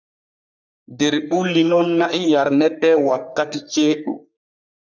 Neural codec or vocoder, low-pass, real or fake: codec, 44.1 kHz, 3.4 kbps, Pupu-Codec; 7.2 kHz; fake